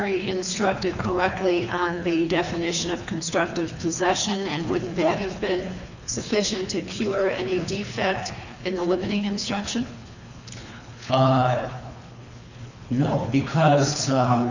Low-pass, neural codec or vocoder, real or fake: 7.2 kHz; codec, 24 kHz, 3 kbps, HILCodec; fake